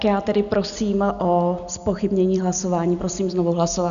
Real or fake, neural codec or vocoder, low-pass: real; none; 7.2 kHz